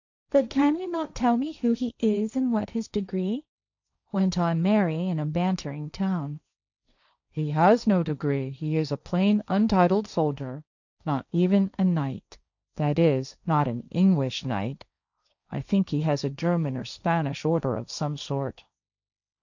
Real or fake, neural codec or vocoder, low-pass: fake; codec, 16 kHz, 1.1 kbps, Voila-Tokenizer; 7.2 kHz